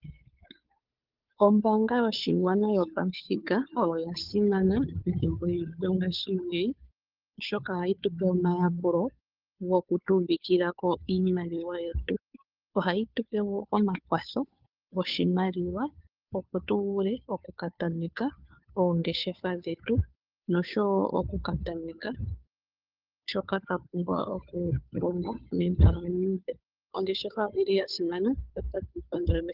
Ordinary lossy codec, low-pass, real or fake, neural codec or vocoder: Opus, 32 kbps; 5.4 kHz; fake; codec, 16 kHz, 2 kbps, FunCodec, trained on Chinese and English, 25 frames a second